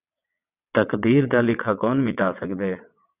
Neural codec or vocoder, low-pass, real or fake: vocoder, 22.05 kHz, 80 mel bands, WaveNeXt; 3.6 kHz; fake